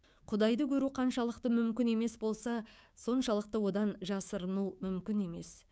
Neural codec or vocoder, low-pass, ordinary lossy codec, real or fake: codec, 16 kHz, 6 kbps, DAC; none; none; fake